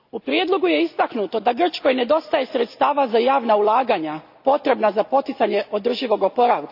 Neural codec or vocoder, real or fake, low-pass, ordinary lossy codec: none; real; 5.4 kHz; AAC, 48 kbps